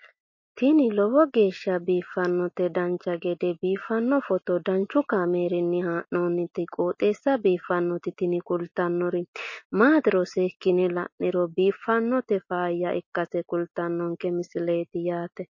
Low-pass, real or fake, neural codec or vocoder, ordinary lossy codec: 7.2 kHz; real; none; MP3, 32 kbps